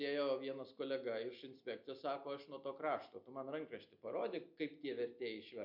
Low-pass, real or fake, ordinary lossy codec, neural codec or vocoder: 5.4 kHz; real; MP3, 48 kbps; none